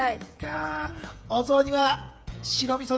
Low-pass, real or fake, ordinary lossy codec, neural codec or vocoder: none; fake; none; codec, 16 kHz, 8 kbps, FreqCodec, smaller model